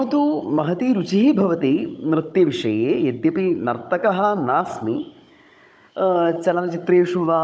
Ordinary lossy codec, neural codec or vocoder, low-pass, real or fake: none; codec, 16 kHz, 16 kbps, FunCodec, trained on Chinese and English, 50 frames a second; none; fake